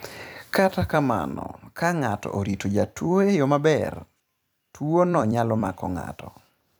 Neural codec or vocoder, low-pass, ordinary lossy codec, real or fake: vocoder, 44.1 kHz, 128 mel bands every 256 samples, BigVGAN v2; none; none; fake